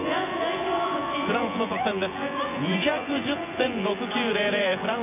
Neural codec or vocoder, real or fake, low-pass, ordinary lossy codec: vocoder, 24 kHz, 100 mel bands, Vocos; fake; 3.6 kHz; none